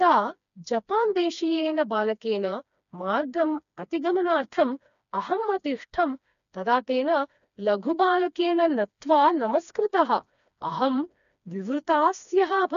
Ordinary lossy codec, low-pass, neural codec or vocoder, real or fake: none; 7.2 kHz; codec, 16 kHz, 2 kbps, FreqCodec, smaller model; fake